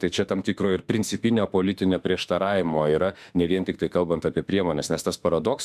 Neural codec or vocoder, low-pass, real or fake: autoencoder, 48 kHz, 32 numbers a frame, DAC-VAE, trained on Japanese speech; 14.4 kHz; fake